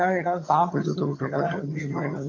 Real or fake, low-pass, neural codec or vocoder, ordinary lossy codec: fake; 7.2 kHz; vocoder, 22.05 kHz, 80 mel bands, HiFi-GAN; AAC, 32 kbps